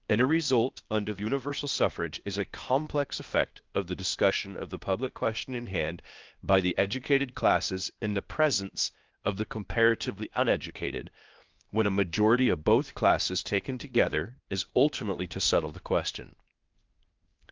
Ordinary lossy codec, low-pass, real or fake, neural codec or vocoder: Opus, 24 kbps; 7.2 kHz; fake; codec, 16 kHz, 0.8 kbps, ZipCodec